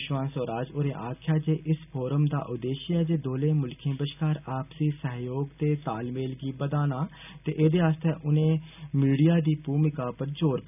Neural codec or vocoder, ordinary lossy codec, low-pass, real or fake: none; none; 3.6 kHz; real